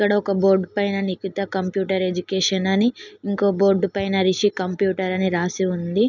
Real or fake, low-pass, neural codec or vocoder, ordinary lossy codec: real; 7.2 kHz; none; none